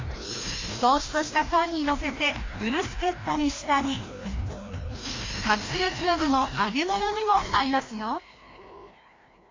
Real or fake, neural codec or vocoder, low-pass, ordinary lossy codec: fake; codec, 16 kHz, 1 kbps, FreqCodec, larger model; 7.2 kHz; AAC, 32 kbps